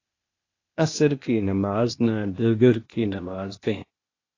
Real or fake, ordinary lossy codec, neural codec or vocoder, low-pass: fake; AAC, 32 kbps; codec, 16 kHz, 0.8 kbps, ZipCodec; 7.2 kHz